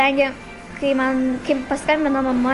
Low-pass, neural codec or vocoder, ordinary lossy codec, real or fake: 14.4 kHz; none; MP3, 48 kbps; real